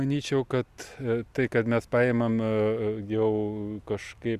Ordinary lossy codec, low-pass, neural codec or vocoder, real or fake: Opus, 64 kbps; 14.4 kHz; none; real